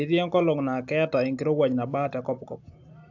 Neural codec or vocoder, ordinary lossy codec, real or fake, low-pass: none; AAC, 48 kbps; real; 7.2 kHz